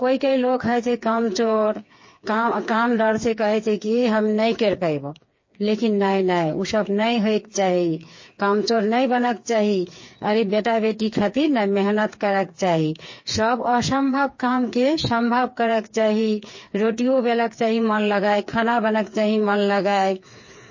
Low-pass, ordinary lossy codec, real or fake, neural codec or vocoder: 7.2 kHz; MP3, 32 kbps; fake; codec, 16 kHz, 4 kbps, FreqCodec, smaller model